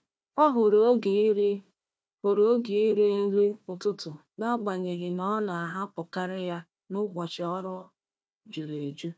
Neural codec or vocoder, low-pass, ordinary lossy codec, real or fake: codec, 16 kHz, 1 kbps, FunCodec, trained on Chinese and English, 50 frames a second; none; none; fake